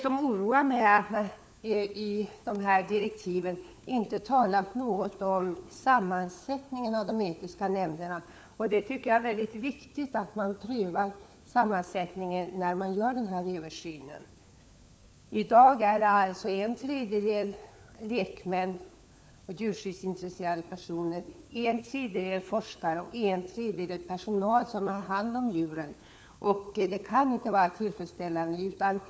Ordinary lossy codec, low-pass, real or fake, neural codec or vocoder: none; none; fake; codec, 16 kHz, 4 kbps, FunCodec, trained on LibriTTS, 50 frames a second